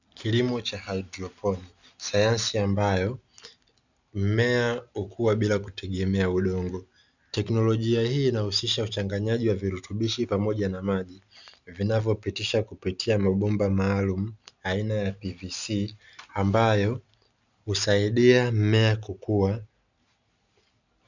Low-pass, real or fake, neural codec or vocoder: 7.2 kHz; real; none